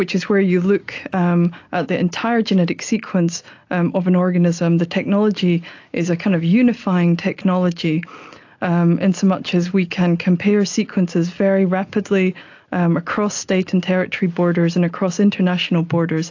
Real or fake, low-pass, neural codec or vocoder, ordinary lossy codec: real; 7.2 kHz; none; AAC, 48 kbps